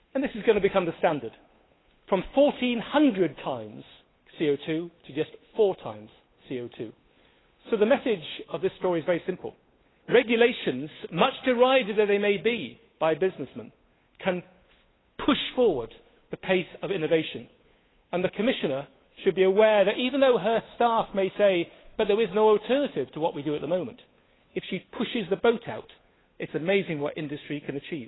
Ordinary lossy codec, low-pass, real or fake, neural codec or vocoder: AAC, 16 kbps; 7.2 kHz; fake; codec, 16 kHz, 8 kbps, FunCodec, trained on Chinese and English, 25 frames a second